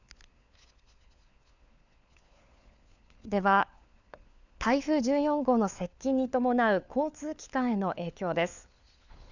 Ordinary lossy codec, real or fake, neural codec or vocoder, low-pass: none; fake; codec, 16 kHz, 4 kbps, FunCodec, trained on LibriTTS, 50 frames a second; 7.2 kHz